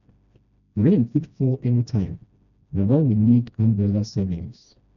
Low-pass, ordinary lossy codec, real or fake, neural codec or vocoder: 7.2 kHz; none; fake; codec, 16 kHz, 1 kbps, FreqCodec, smaller model